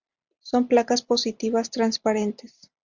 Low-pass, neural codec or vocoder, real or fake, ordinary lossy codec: 7.2 kHz; none; real; Opus, 64 kbps